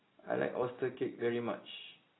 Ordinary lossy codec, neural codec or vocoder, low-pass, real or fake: AAC, 16 kbps; none; 7.2 kHz; real